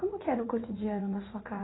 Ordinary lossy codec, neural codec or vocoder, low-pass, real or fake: AAC, 16 kbps; vocoder, 44.1 kHz, 80 mel bands, Vocos; 7.2 kHz; fake